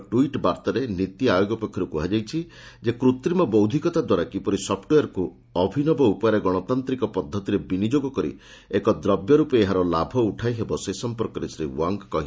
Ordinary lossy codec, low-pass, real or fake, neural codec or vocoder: none; none; real; none